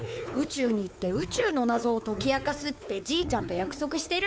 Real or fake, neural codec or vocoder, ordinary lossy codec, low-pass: fake; codec, 16 kHz, 4 kbps, X-Codec, WavLM features, trained on Multilingual LibriSpeech; none; none